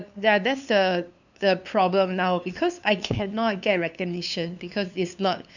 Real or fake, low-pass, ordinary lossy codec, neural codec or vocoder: fake; 7.2 kHz; none; codec, 16 kHz, 2 kbps, FunCodec, trained on LibriTTS, 25 frames a second